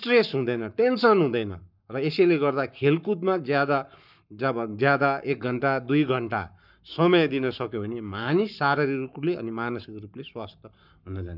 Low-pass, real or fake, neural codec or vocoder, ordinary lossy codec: 5.4 kHz; fake; codec, 44.1 kHz, 7.8 kbps, Pupu-Codec; none